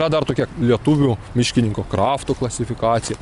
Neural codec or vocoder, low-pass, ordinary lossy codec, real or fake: none; 10.8 kHz; Opus, 64 kbps; real